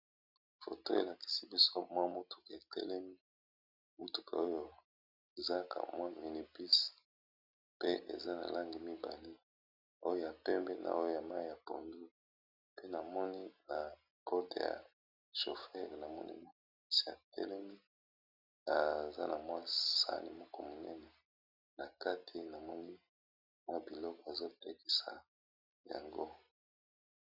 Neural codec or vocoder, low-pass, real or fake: none; 5.4 kHz; real